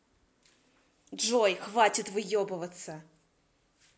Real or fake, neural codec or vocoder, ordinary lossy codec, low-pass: real; none; none; none